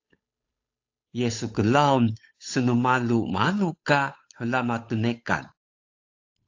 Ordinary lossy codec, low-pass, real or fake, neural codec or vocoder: AAC, 48 kbps; 7.2 kHz; fake; codec, 16 kHz, 8 kbps, FunCodec, trained on Chinese and English, 25 frames a second